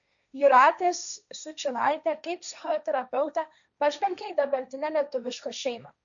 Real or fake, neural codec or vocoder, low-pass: fake; codec, 16 kHz, 1.1 kbps, Voila-Tokenizer; 7.2 kHz